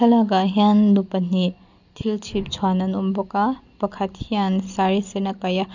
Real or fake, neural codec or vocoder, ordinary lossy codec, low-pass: real; none; none; 7.2 kHz